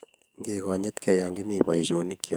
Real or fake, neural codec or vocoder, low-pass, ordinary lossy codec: fake; codec, 44.1 kHz, 2.6 kbps, SNAC; none; none